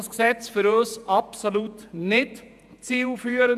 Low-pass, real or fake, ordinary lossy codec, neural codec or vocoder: 14.4 kHz; fake; none; vocoder, 48 kHz, 128 mel bands, Vocos